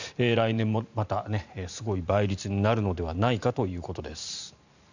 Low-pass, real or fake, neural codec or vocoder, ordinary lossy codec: 7.2 kHz; fake; vocoder, 44.1 kHz, 128 mel bands every 512 samples, BigVGAN v2; none